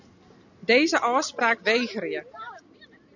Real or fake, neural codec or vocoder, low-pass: real; none; 7.2 kHz